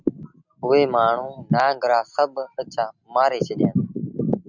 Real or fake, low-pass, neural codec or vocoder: real; 7.2 kHz; none